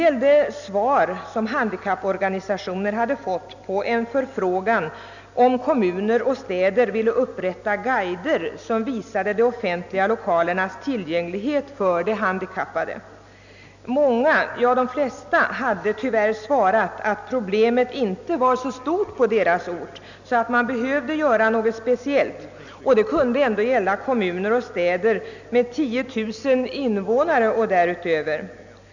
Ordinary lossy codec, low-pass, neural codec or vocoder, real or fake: none; 7.2 kHz; none; real